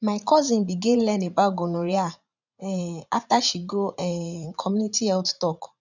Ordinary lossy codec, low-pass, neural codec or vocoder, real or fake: none; 7.2 kHz; vocoder, 44.1 kHz, 80 mel bands, Vocos; fake